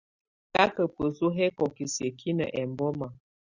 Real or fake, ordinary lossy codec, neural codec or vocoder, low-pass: real; Opus, 64 kbps; none; 7.2 kHz